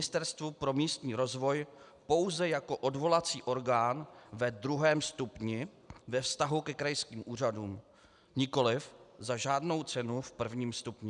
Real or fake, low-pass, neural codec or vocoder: real; 10.8 kHz; none